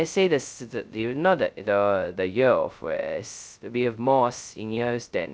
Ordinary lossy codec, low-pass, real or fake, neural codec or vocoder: none; none; fake; codec, 16 kHz, 0.2 kbps, FocalCodec